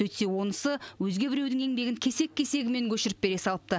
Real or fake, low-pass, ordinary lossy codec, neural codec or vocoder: real; none; none; none